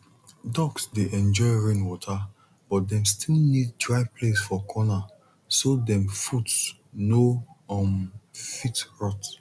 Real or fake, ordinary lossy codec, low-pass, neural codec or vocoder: real; none; none; none